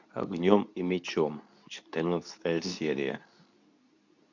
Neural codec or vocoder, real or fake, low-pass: codec, 24 kHz, 0.9 kbps, WavTokenizer, medium speech release version 2; fake; 7.2 kHz